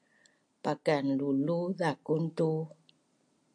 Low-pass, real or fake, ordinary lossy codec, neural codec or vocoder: 9.9 kHz; real; AAC, 64 kbps; none